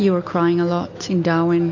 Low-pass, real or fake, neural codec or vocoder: 7.2 kHz; real; none